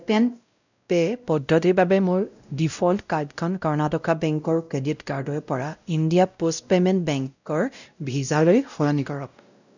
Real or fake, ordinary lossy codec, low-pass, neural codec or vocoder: fake; none; 7.2 kHz; codec, 16 kHz, 0.5 kbps, X-Codec, WavLM features, trained on Multilingual LibriSpeech